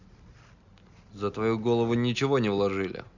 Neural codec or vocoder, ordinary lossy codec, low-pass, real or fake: none; none; 7.2 kHz; real